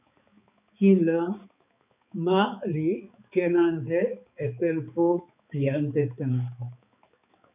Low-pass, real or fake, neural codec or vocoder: 3.6 kHz; fake; codec, 16 kHz, 4 kbps, X-Codec, HuBERT features, trained on balanced general audio